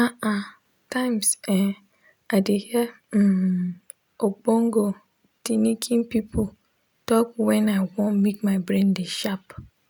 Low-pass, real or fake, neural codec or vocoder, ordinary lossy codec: none; real; none; none